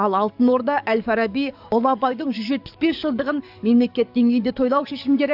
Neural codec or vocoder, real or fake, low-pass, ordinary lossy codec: codec, 44.1 kHz, 7.8 kbps, DAC; fake; 5.4 kHz; AAC, 48 kbps